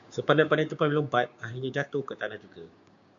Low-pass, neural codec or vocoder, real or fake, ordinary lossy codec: 7.2 kHz; codec, 16 kHz, 6 kbps, DAC; fake; MP3, 64 kbps